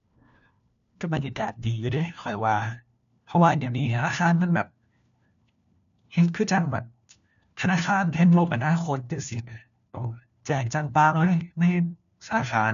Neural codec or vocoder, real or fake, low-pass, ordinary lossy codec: codec, 16 kHz, 1 kbps, FunCodec, trained on LibriTTS, 50 frames a second; fake; 7.2 kHz; none